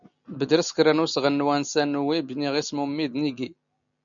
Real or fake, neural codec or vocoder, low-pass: real; none; 7.2 kHz